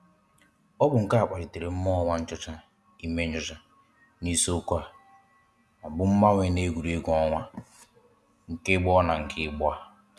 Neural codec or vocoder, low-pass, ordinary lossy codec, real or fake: none; none; none; real